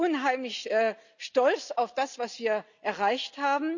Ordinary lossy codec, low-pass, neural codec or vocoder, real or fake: none; 7.2 kHz; none; real